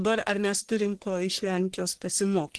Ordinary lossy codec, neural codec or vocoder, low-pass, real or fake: Opus, 16 kbps; codec, 44.1 kHz, 1.7 kbps, Pupu-Codec; 10.8 kHz; fake